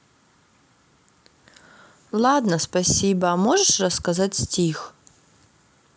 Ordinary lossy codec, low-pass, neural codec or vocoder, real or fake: none; none; none; real